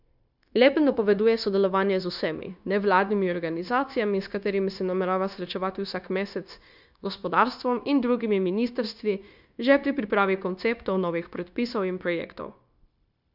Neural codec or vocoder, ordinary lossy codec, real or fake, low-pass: codec, 16 kHz, 0.9 kbps, LongCat-Audio-Codec; none; fake; 5.4 kHz